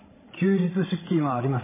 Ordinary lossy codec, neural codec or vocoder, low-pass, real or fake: MP3, 16 kbps; codec, 16 kHz, 16 kbps, FreqCodec, larger model; 3.6 kHz; fake